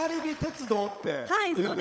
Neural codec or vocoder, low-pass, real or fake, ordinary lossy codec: codec, 16 kHz, 16 kbps, FunCodec, trained on LibriTTS, 50 frames a second; none; fake; none